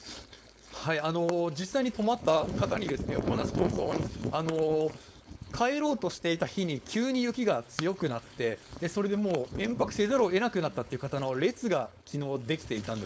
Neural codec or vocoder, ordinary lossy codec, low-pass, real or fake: codec, 16 kHz, 4.8 kbps, FACodec; none; none; fake